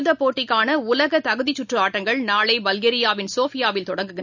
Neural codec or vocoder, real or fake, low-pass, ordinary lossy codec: none; real; 7.2 kHz; none